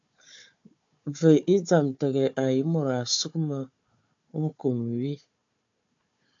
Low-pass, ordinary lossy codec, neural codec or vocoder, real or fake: 7.2 kHz; AAC, 64 kbps; codec, 16 kHz, 4 kbps, FunCodec, trained on Chinese and English, 50 frames a second; fake